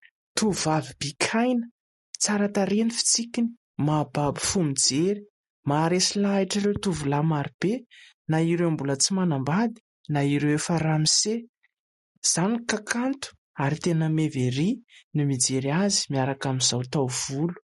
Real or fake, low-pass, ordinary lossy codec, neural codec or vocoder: real; 19.8 kHz; MP3, 48 kbps; none